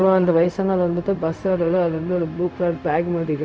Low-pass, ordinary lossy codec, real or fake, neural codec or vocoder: none; none; fake; codec, 16 kHz, 0.4 kbps, LongCat-Audio-Codec